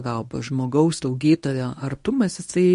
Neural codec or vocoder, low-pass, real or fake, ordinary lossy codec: codec, 24 kHz, 0.9 kbps, WavTokenizer, medium speech release version 1; 10.8 kHz; fake; MP3, 48 kbps